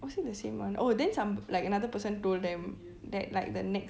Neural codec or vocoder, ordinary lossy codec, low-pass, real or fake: none; none; none; real